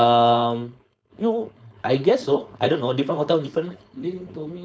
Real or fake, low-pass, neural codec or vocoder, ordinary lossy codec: fake; none; codec, 16 kHz, 4.8 kbps, FACodec; none